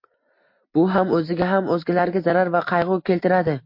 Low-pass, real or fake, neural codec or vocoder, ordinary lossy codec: 5.4 kHz; real; none; MP3, 32 kbps